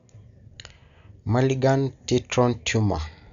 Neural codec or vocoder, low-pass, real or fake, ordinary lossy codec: none; 7.2 kHz; real; Opus, 64 kbps